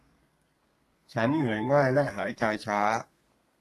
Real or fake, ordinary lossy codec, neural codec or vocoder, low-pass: fake; AAC, 48 kbps; codec, 32 kHz, 1.9 kbps, SNAC; 14.4 kHz